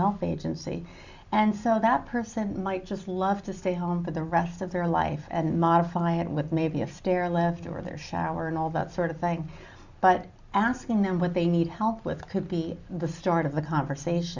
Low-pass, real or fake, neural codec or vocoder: 7.2 kHz; real; none